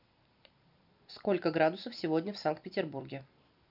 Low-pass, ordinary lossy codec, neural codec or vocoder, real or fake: 5.4 kHz; none; none; real